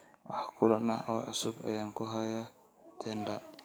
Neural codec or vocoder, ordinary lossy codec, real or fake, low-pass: codec, 44.1 kHz, 7.8 kbps, DAC; none; fake; none